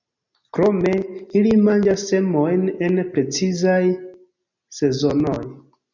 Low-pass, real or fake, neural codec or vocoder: 7.2 kHz; real; none